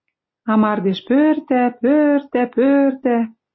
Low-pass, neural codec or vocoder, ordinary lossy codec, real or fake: 7.2 kHz; none; MP3, 24 kbps; real